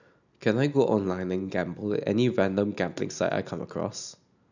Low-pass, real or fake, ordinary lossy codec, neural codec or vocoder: 7.2 kHz; real; none; none